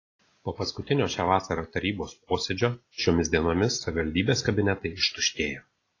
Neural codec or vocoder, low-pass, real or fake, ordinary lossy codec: none; 7.2 kHz; real; AAC, 32 kbps